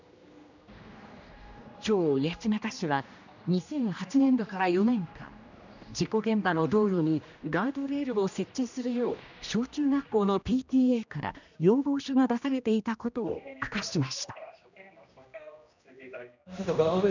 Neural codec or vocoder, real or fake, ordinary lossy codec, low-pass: codec, 16 kHz, 1 kbps, X-Codec, HuBERT features, trained on general audio; fake; none; 7.2 kHz